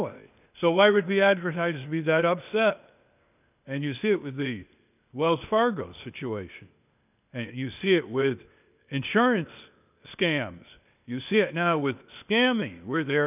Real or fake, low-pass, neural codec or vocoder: fake; 3.6 kHz; codec, 16 kHz, 0.8 kbps, ZipCodec